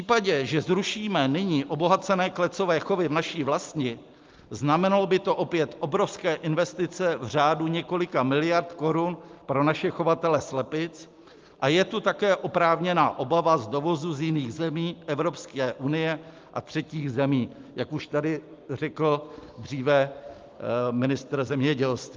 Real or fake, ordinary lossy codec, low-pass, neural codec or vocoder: real; Opus, 32 kbps; 7.2 kHz; none